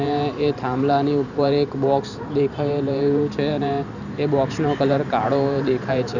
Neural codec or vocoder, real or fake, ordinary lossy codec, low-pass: vocoder, 44.1 kHz, 128 mel bands every 512 samples, BigVGAN v2; fake; none; 7.2 kHz